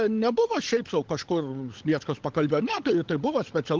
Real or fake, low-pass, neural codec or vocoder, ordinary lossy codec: fake; 7.2 kHz; codec, 16 kHz, 16 kbps, FunCodec, trained on LibriTTS, 50 frames a second; Opus, 16 kbps